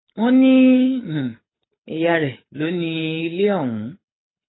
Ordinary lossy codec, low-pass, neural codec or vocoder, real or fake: AAC, 16 kbps; 7.2 kHz; codec, 24 kHz, 6 kbps, HILCodec; fake